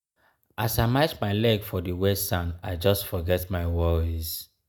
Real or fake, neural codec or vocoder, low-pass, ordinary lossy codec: real; none; none; none